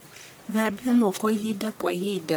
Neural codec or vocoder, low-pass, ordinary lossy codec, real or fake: codec, 44.1 kHz, 1.7 kbps, Pupu-Codec; none; none; fake